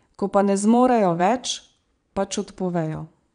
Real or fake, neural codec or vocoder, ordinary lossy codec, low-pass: fake; vocoder, 22.05 kHz, 80 mel bands, Vocos; none; 9.9 kHz